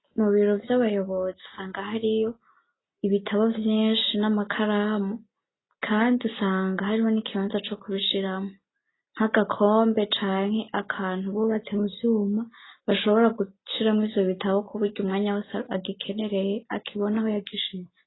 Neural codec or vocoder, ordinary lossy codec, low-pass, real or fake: none; AAC, 16 kbps; 7.2 kHz; real